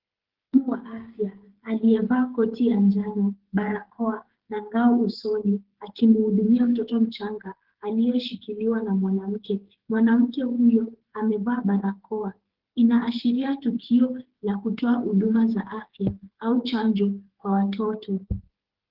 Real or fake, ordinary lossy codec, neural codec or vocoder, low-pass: fake; Opus, 16 kbps; codec, 16 kHz, 8 kbps, FreqCodec, smaller model; 5.4 kHz